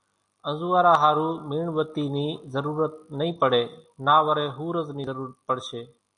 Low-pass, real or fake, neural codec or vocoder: 10.8 kHz; real; none